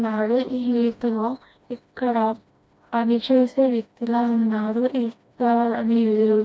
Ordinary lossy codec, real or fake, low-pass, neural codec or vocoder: none; fake; none; codec, 16 kHz, 1 kbps, FreqCodec, smaller model